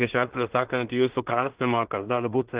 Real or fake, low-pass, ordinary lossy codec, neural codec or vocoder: fake; 3.6 kHz; Opus, 16 kbps; codec, 16 kHz in and 24 kHz out, 0.4 kbps, LongCat-Audio-Codec, two codebook decoder